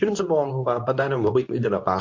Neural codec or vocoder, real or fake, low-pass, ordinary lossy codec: codec, 24 kHz, 0.9 kbps, WavTokenizer, medium speech release version 2; fake; 7.2 kHz; MP3, 48 kbps